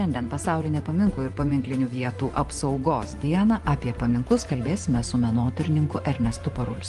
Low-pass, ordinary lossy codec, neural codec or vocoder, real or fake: 10.8 kHz; Opus, 24 kbps; vocoder, 24 kHz, 100 mel bands, Vocos; fake